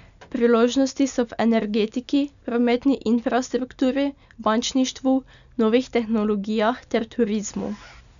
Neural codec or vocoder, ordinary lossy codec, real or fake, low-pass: none; none; real; 7.2 kHz